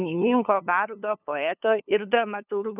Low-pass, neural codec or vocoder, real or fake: 3.6 kHz; codec, 16 kHz, 2 kbps, FunCodec, trained on LibriTTS, 25 frames a second; fake